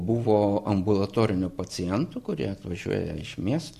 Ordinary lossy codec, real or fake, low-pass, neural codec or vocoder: Opus, 64 kbps; real; 14.4 kHz; none